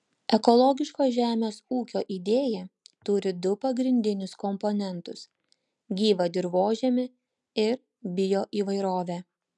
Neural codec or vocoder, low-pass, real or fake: none; 10.8 kHz; real